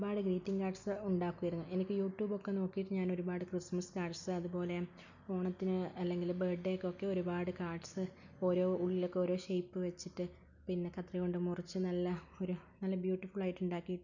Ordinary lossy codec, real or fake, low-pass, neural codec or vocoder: none; real; 7.2 kHz; none